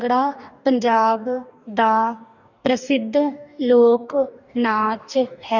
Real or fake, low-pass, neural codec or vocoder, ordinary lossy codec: fake; 7.2 kHz; codec, 44.1 kHz, 2.6 kbps, DAC; none